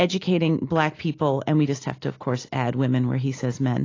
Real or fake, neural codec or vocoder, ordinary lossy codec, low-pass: real; none; AAC, 32 kbps; 7.2 kHz